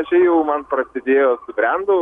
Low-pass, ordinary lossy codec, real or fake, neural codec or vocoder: 10.8 kHz; MP3, 96 kbps; real; none